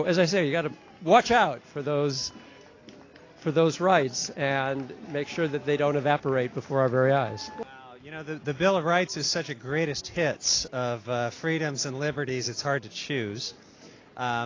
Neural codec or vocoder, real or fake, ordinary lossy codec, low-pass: none; real; AAC, 32 kbps; 7.2 kHz